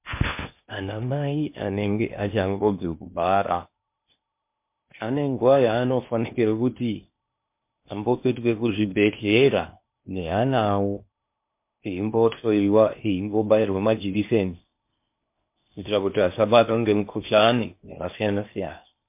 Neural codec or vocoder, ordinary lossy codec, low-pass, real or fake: codec, 16 kHz in and 24 kHz out, 0.8 kbps, FocalCodec, streaming, 65536 codes; MP3, 32 kbps; 3.6 kHz; fake